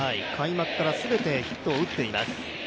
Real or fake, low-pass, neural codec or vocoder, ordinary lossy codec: real; none; none; none